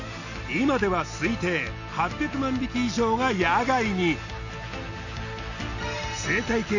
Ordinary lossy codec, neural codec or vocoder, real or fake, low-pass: none; none; real; 7.2 kHz